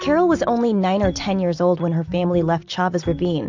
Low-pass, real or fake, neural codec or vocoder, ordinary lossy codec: 7.2 kHz; real; none; AAC, 48 kbps